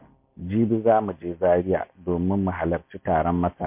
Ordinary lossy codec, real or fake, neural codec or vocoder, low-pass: MP3, 24 kbps; real; none; 3.6 kHz